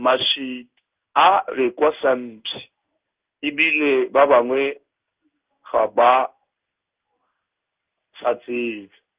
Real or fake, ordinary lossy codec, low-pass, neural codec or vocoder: fake; Opus, 32 kbps; 3.6 kHz; codec, 16 kHz in and 24 kHz out, 1 kbps, XY-Tokenizer